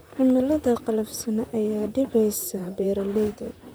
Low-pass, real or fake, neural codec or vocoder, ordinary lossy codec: none; fake; vocoder, 44.1 kHz, 128 mel bands, Pupu-Vocoder; none